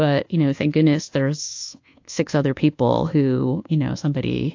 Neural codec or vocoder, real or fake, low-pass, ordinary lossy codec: codec, 24 kHz, 1.2 kbps, DualCodec; fake; 7.2 kHz; AAC, 48 kbps